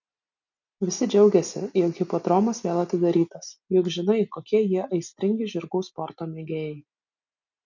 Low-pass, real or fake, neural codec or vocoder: 7.2 kHz; real; none